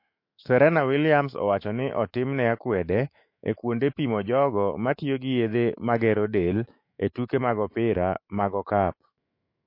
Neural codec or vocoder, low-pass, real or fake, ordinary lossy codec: autoencoder, 48 kHz, 128 numbers a frame, DAC-VAE, trained on Japanese speech; 5.4 kHz; fake; MP3, 32 kbps